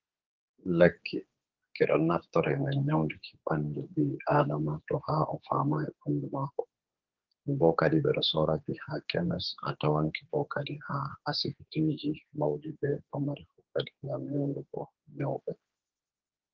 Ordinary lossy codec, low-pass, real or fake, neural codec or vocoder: Opus, 16 kbps; 7.2 kHz; fake; codec, 16 kHz, 4 kbps, X-Codec, HuBERT features, trained on general audio